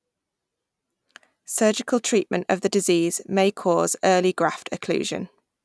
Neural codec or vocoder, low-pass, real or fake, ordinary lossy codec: none; none; real; none